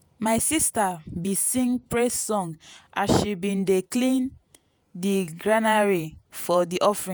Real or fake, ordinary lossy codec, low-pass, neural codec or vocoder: fake; none; none; vocoder, 48 kHz, 128 mel bands, Vocos